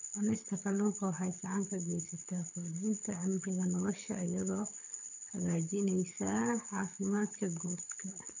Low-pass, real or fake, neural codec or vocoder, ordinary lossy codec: 7.2 kHz; fake; codec, 24 kHz, 6 kbps, HILCodec; none